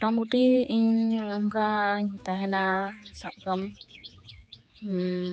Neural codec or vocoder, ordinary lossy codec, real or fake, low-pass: codec, 16 kHz, 4 kbps, X-Codec, HuBERT features, trained on general audio; none; fake; none